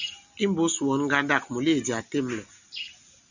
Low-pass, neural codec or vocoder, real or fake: 7.2 kHz; none; real